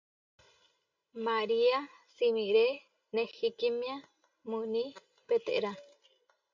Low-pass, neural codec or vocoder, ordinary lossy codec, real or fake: 7.2 kHz; none; AAC, 48 kbps; real